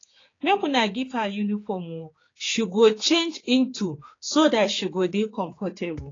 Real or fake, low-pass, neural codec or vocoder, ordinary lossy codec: fake; 7.2 kHz; codec, 16 kHz, 4 kbps, X-Codec, HuBERT features, trained on general audio; AAC, 32 kbps